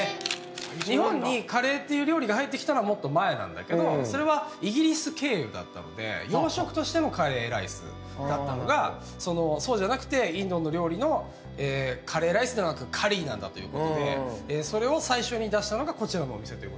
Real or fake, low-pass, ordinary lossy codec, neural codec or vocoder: real; none; none; none